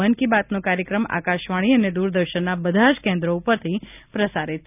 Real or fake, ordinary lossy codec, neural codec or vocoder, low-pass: real; none; none; 3.6 kHz